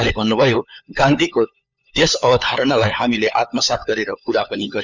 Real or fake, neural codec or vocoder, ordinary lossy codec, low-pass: fake; codec, 16 kHz, 4 kbps, FunCodec, trained on LibriTTS, 50 frames a second; none; 7.2 kHz